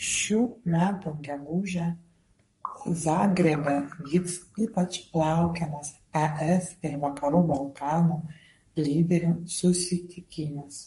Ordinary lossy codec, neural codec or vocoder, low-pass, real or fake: MP3, 48 kbps; codec, 44.1 kHz, 3.4 kbps, Pupu-Codec; 14.4 kHz; fake